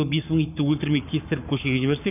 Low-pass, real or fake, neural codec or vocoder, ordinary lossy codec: 3.6 kHz; fake; codec, 44.1 kHz, 7.8 kbps, Pupu-Codec; none